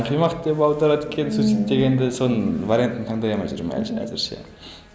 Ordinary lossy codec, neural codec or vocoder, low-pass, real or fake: none; none; none; real